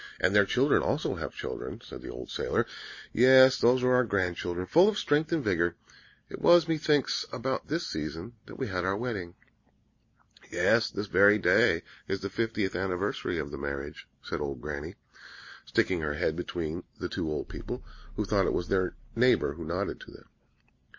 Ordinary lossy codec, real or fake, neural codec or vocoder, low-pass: MP3, 32 kbps; real; none; 7.2 kHz